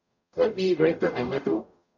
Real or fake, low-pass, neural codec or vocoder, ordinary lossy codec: fake; 7.2 kHz; codec, 44.1 kHz, 0.9 kbps, DAC; none